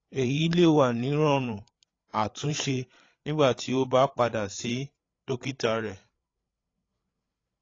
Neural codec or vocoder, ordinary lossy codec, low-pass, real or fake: codec, 16 kHz, 8 kbps, FreqCodec, larger model; AAC, 32 kbps; 7.2 kHz; fake